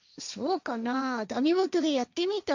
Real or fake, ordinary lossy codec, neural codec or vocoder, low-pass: fake; none; codec, 16 kHz, 1.1 kbps, Voila-Tokenizer; 7.2 kHz